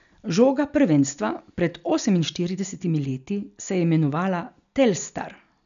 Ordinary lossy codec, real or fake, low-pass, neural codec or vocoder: none; real; 7.2 kHz; none